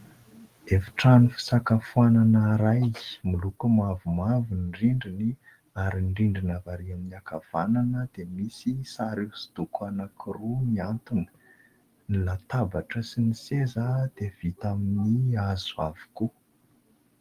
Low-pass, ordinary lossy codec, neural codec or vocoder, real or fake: 14.4 kHz; Opus, 24 kbps; none; real